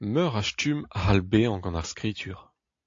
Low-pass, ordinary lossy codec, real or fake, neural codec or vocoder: 7.2 kHz; AAC, 48 kbps; real; none